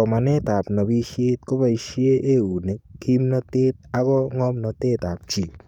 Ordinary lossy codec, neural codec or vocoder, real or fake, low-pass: none; codec, 44.1 kHz, 7.8 kbps, DAC; fake; 19.8 kHz